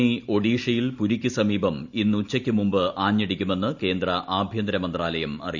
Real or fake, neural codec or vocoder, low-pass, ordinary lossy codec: real; none; 7.2 kHz; none